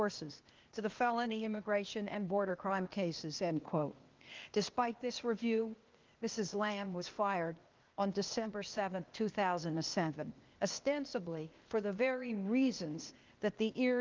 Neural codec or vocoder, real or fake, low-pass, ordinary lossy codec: codec, 16 kHz, 0.8 kbps, ZipCodec; fake; 7.2 kHz; Opus, 32 kbps